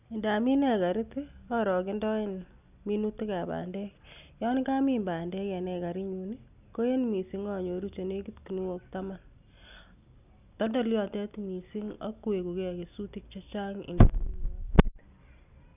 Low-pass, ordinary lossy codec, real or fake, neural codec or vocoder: 3.6 kHz; none; real; none